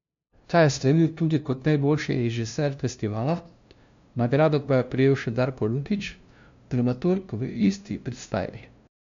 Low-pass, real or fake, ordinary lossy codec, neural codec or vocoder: 7.2 kHz; fake; MP3, 64 kbps; codec, 16 kHz, 0.5 kbps, FunCodec, trained on LibriTTS, 25 frames a second